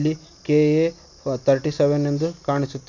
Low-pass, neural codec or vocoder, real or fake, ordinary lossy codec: 7.2 kHz; none; real; none